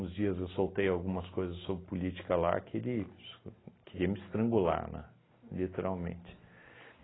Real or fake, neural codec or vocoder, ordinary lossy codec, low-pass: real; none; AAC, 16 kbps; 7.2 kHz